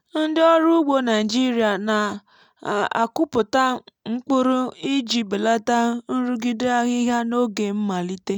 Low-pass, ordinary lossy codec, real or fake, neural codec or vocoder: 19.8 kHz; none; real; none